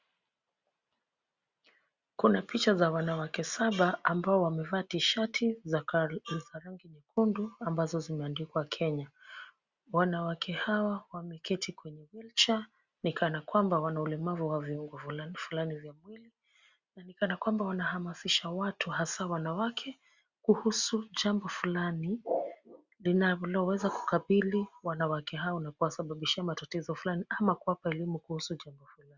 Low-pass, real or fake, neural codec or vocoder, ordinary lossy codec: 7.2 kHz; real; none; Opus, 64 kbps